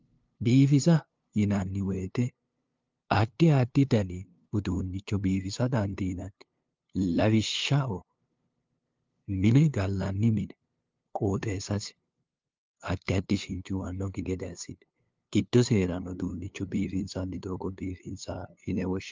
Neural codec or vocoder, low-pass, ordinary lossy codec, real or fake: codec, 16 kHz, 2 kbps, FunCodec, trained on LibriTTS, 25 frames a second; 7.2 kHz; Opus, 24 kbps; fake